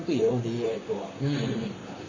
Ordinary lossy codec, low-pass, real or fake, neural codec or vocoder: AAC, 32 kbps; 7.2 kHz; fake; vocoder, 44.1 kHz, 128 mel bands, Pupu-Vocoder